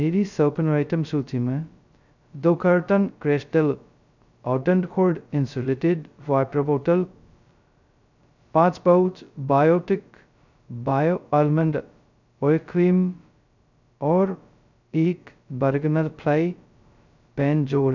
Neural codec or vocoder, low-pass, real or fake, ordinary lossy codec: codec, 16 kHz, 0.2 kbps, FocalCodec; 7.2 kHz; fake; none